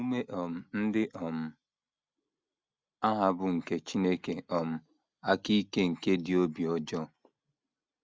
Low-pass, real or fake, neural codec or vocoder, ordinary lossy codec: none; real; none; none